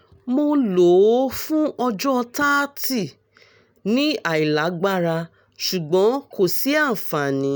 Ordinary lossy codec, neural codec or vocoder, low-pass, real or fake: none; none; none; real